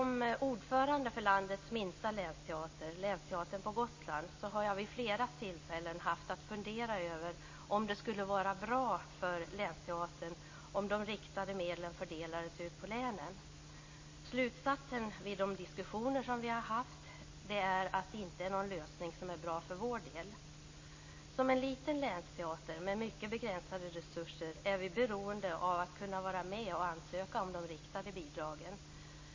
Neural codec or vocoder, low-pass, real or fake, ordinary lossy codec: none; 7.2 kHz; real; MP3, 32 kbps